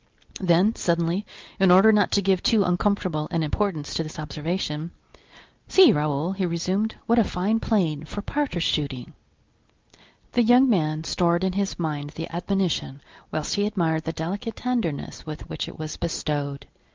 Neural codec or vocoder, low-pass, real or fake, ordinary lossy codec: none; 7.2 kHz; real; Opus, 32 kbps